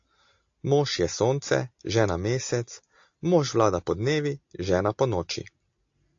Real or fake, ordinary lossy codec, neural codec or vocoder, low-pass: real; AAC, 48 kbps; none; 7.2 kHz